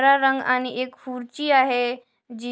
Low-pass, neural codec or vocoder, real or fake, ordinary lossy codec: none; none; real; none